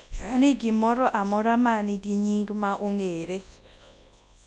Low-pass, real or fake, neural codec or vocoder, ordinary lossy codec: 10.8 kHz; fake; codec, 24 kHz, 0.9 kbps, WavTokenizer, large speech release; none